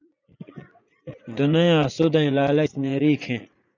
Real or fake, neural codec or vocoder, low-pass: fake; vocoder, 44.1 kHz, 80 mel bands, Vocos; 7.2 kHz